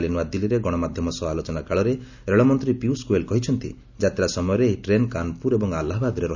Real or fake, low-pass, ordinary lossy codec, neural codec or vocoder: real; 7.2 kHz; none; none